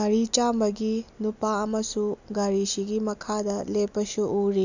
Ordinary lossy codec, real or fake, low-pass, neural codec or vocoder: none; real; 7.2 kHz; none